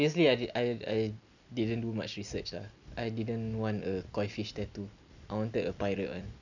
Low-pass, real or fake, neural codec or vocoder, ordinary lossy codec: 7.2 kHz; real; none; none